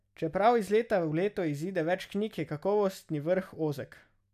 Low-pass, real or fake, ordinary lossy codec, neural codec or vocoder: 14.4 kHz; real; none; none